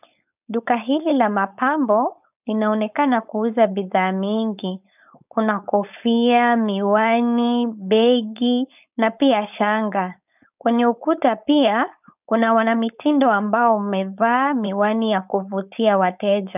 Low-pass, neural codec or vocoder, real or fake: 3.6 kHz; codec, 16 kHz, 4.8 kbps, FACodec; fake